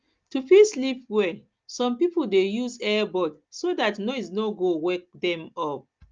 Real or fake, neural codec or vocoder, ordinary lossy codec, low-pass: real; none; Opus, 24 kbps; 7.2 kHz